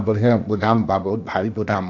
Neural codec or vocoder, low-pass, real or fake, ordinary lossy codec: codec, 16 kHz, 0.8 kbps, ZipCodec; 7.2 kHz; fake; AAC, 48 kbps